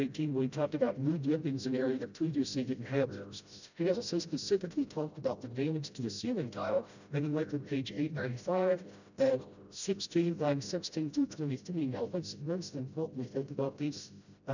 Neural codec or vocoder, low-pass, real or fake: codec, 16 kHz, 0.5 kbps, FreqCodec, smaller model; 7.2 kHz; fake